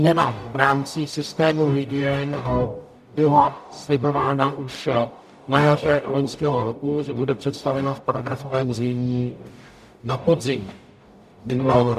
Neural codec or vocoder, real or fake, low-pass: codec, 44.1 kHz, 0.9 kbps, DAC; fake; 14.4 kHz